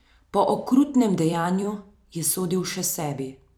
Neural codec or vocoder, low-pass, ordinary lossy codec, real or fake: vocoder, 44.1 kHz, 128 mel bands every 256 samples, BigVGAN v2; none; none; fake